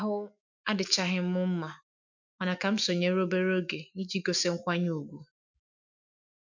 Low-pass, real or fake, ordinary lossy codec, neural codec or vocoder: 7.2 kHz; fake; none; autoencoder, 48 kHz, 128 numbers a frame, DAC-VAE, trained on Japanese speech